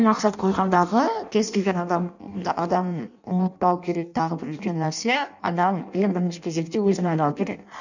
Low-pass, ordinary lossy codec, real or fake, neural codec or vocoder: 7.2 kHz; none; fake; codec, 16 kHz in and 24 kHz out, 0.6 kbps, FireRedTTS-2 codec